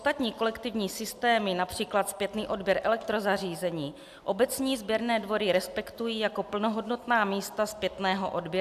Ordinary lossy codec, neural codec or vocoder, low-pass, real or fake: AAC, 96 kbps; none; 14.4 kHz; real